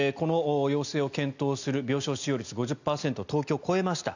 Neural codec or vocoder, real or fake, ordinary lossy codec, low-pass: none; real; none; 7.2 kHz